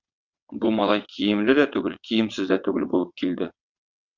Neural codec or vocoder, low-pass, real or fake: vocoder, 22.05 kHz, 80 mel bands, WaveNeXt; 7.2 kHz; fake